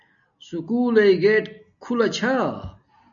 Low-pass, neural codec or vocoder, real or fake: 7.2 kHz; none; real